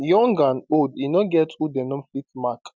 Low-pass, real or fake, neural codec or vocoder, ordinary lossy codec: none; real; none; none